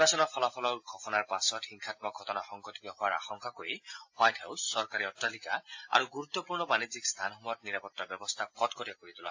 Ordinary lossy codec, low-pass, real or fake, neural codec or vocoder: AAC, 48 kbps; 7.2 kHz; real; none